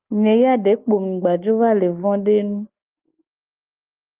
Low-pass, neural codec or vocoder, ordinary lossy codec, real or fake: 3.6 kHz; none; Opus, 32 kbps; real